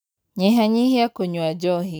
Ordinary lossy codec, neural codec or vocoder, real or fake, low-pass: none; none; real; none